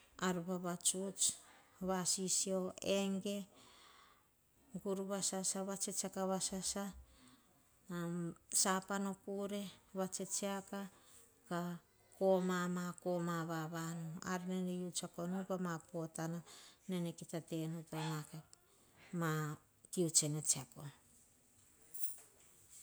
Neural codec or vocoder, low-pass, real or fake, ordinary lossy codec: none; none; real; none